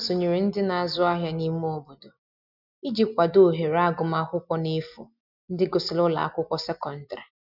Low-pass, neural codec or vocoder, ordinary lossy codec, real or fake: 5.4 kHz; none; none; real